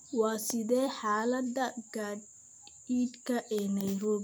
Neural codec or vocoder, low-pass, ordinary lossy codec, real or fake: vocoder, 44.1 kHz, 128 mel bands every 256 samples, BigVGAN v2; none; none; fake